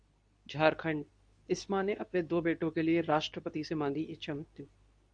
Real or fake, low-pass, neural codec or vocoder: fake; 9.9 kHz; codec, 24 kHz, 0.9 kbps, WavTokenizer, medium speech release version 2